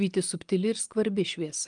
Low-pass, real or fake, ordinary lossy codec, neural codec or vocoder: 9.9 kHz; real; Opus, 32 kbps; none